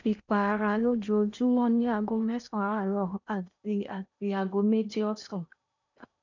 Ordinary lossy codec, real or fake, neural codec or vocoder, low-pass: none; fake; codec, 16 kHz in and 24 kHz out, 0.8 kbps, FocalCodec, streaming, 65536 codes; 7.2 kHz